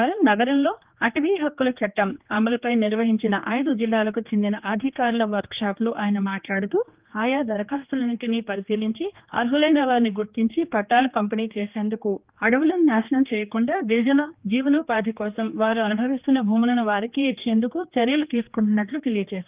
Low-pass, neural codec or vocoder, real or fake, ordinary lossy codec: 3.6 kHz; codec, 16 kHz, 2 kbps, X-Codec, HuBERT features, trained on general audio; fake; Opus, 24 kbps